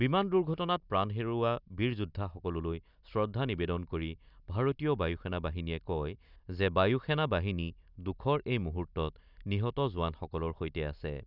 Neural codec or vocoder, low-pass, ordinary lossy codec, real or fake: none; 5.4 kHz; none; real